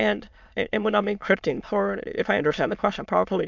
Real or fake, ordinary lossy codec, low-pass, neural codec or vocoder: fake; MP3, 64 kbps; 7.2 kHz; autoencoder, 22.05 kHz, a latent of 192 numbers a frame, VITS, trained on many speakers